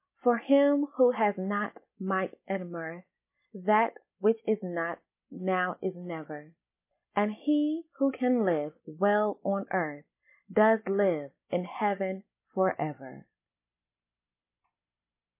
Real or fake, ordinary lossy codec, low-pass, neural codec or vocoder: real; MP3, 24 kbps; 3.6 kHz; none